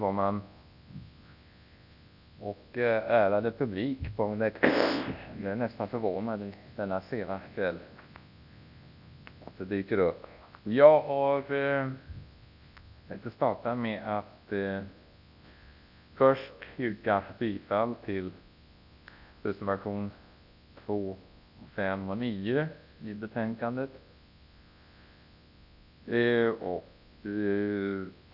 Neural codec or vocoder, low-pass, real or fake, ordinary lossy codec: codec, 24 kHz, 0.9 kbps, WavTokenizer, large speech release; 5.4 kHz; fake; none